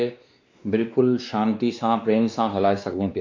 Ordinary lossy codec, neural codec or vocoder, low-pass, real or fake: MP3, 64 kbps; codec, 16 kHz, 2 kbps, X-Codec, WavLM features, trained on Multilingual LibriSpeech; 7.2 kHz; fake